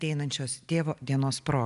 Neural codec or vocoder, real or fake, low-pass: none; real; 10.8 kHz